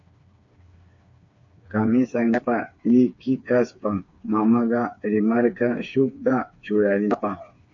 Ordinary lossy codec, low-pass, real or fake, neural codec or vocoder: AAC, 48 kbps; 7.2 kHz; fake; codec, 16 kHz, 4 kbps, FreqCodec, smaller model